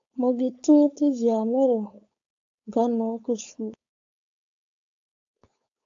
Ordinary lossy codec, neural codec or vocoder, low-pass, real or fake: AAC, 64 kbps; codec, 16 kHz, 4.8 kbps, FACodec; 7.2 kHz; fake